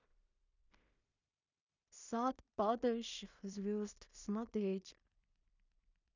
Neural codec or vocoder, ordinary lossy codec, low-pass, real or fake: codec, 16 kHz in and 24 kHz out, 0.4 kbps, LongCat-Audio-Codec, two codebook decoder; none; 7.2 kHz; fake